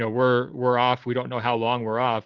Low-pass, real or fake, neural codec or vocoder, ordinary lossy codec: 7.2 kHz; real; none; Opus, 16 kbps